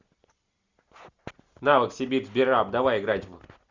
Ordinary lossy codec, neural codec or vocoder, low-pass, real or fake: Opus, 64 kbps; none; 7.2 kHz; real